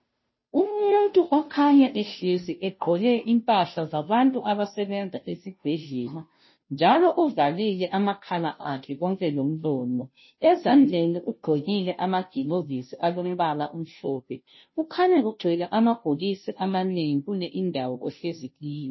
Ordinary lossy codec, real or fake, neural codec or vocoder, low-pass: MP3, 24 kbps; fake; codec, 16 kHz, 0.5 kbps, FunCodec, trained on Chinese and English, 25 frames a second; 7.2 kHz